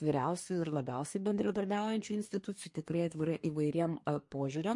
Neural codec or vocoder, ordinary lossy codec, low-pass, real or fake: codec, 24 kHz, 1 kbps, SNAC; MP3, 48 kbps; 10.8 kHz; fake